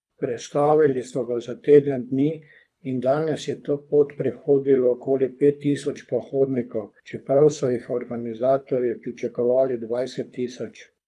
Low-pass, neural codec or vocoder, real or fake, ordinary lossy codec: none; codec, 24 kHz, 3 kbps, HILCodec; fake; none